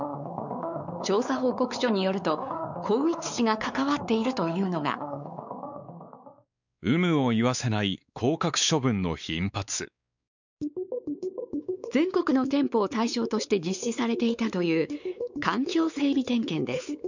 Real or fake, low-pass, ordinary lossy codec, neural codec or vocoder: fake; 7.2 kHz; none; codec, 16 kHz, 4 kbps, X-Codec, WavLM features, trained on Multilingual LibriSpeech